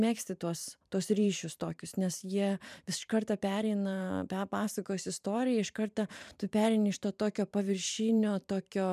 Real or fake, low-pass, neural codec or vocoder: fake; 14.4 kHz; vocoder, 44.1 kHz, 128 mel bands every 512 samples, BigVGAN v2